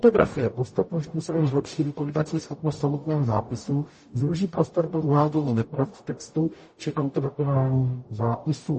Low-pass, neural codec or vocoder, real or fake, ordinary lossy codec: 10.8 kHz; codec, 44.1 kHz, 0.9 kbps, DAC; fake; MP3, 32 kbps